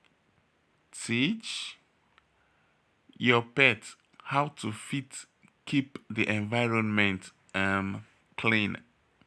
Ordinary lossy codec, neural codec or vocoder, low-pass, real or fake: none; none; none; real